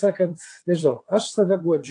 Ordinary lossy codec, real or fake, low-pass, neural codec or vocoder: AAC, 48 kbps; fake; 9.9 kHz; vocoder, 22.05 kHz, 80 mel bands, WaveNeXt